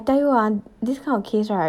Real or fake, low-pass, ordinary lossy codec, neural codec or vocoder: real; 19.8 kHz; none; none